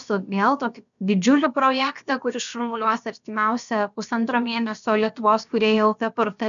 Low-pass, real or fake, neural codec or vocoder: 7.2 kHz; fake; codec, 16 kHz, about 1 kbps, DyCAST, with the encoder's durations